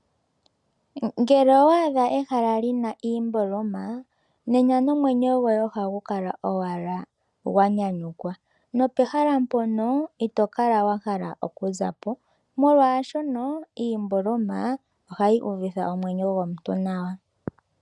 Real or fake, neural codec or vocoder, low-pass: real; none; 10.8 kHz